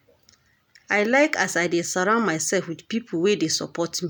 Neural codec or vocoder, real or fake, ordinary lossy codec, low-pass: none; real; none; none